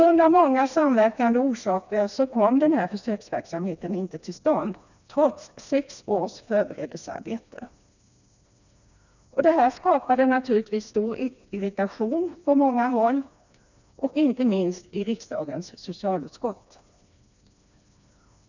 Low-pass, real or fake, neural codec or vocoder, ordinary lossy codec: 7.2 kHz; fake; codec, 16 kHz, 2 kbps, FreqCodec, smaller model; none